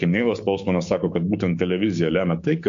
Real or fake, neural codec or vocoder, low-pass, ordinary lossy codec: fake; codec, 16 kHz, 6 kbps, DAC; 7.2 kHz; MP3, 48 kbps